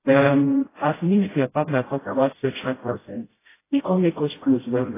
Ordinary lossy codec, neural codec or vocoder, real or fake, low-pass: AAC, 16 kbps; codec, 16 kHz, 0.5 kbps, FreqCodec, smaller model; fake; 3.6 kHz